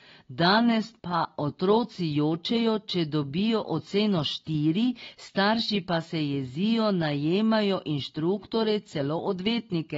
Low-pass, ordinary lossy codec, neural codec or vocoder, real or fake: 7.2 kHz; AAC, 24 kbps; none; real